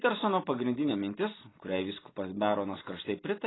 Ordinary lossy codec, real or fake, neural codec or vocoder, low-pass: AAC, 16 kbps; real; none; 7.2 kHz